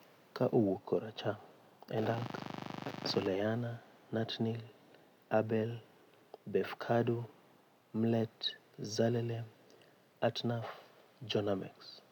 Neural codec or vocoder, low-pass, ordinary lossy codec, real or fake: none; 19.8 kHz; none; real